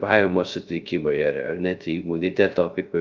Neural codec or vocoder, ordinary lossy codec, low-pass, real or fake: codec, 16 kHz, 0.3 kbps, FocalCodec; Opus, 24 kbps; 7.2 kHz; fake